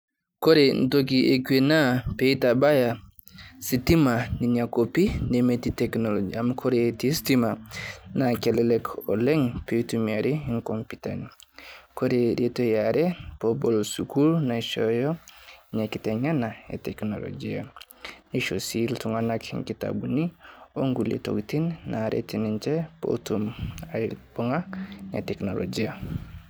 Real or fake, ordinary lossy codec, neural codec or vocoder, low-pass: real; none; none; none